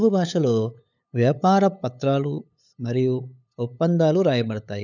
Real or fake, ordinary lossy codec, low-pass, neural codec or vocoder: fake; none; 7.2 kHz; codec, 16 kHz, 8 kbps, FunCodec, trained on LibriTTS, 25 frames a second